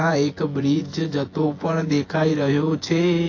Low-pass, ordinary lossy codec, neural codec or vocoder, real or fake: 7.2 kHz; AAC, 32 kbps; vocoder, 24 kHz, 100 mel bands, Vocos; fake